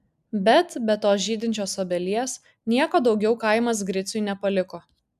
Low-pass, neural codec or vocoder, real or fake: 14.4 kHz; none; real